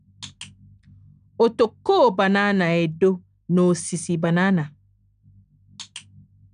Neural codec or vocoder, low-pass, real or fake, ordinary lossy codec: none; 9.9 kHz; real; none